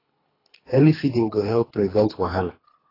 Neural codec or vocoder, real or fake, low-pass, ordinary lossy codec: codec, 24 kHz, 3 kbps, HILCodec; fake; 5.4 kHz; AAC, 24 kbps